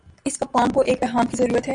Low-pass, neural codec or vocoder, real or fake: 9.9 kHz; none; real